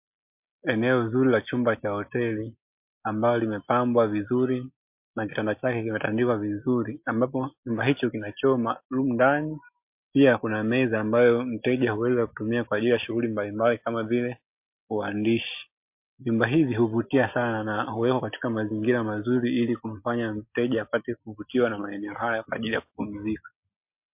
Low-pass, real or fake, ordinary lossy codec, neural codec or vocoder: 3.6 kHz; real; MP3, 32 kbps; none